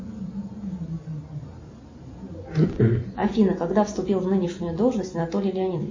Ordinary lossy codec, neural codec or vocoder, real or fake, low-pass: MP3, 32 kbps; none; real; 7.2 kHz